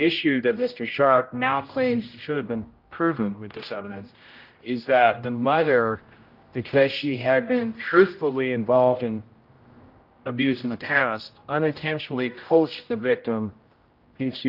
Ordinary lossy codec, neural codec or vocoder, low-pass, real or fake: Opus, 24 kbps; codec, 16 kHz, 0.5 kbps, X-Codec, HuBERT features, trained on general audio; 5.4 kHz; fake